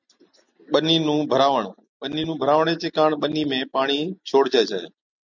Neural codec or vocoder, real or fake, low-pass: none; real; 7.2 kHz